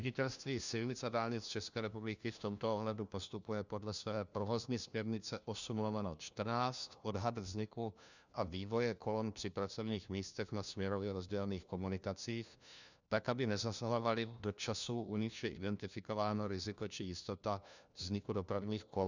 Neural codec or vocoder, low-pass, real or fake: codec, 16 kHz, 1 kbps, FunCodec, trained on LibriTTS, 50 frames a second; 7.2 kHz; fake